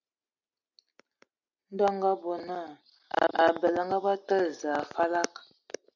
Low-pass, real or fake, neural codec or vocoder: 7.2 kHz; real; none